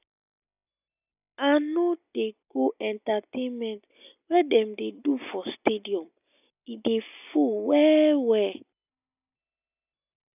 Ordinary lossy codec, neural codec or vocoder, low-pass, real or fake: none; none; 3.6 kHz; real